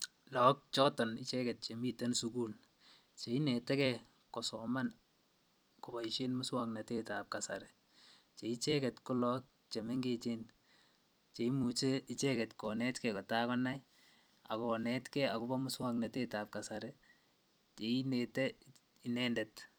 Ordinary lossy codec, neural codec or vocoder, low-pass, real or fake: none; vocoder, 44.1 kHz, 128 mel bands every 256 samples, BigVGAN v2; none; fake